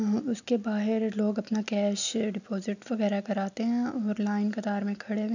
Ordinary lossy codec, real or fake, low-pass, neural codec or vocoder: none; real; 7.2 kHz; none